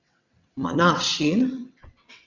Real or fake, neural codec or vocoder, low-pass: fake; vocoder, 22.05 kHz, 80 mel bands, WaveNeXt; 7.2 kHz